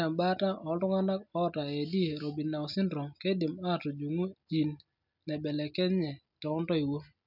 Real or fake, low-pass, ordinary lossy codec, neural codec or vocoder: real; 5.4 kHz; none; none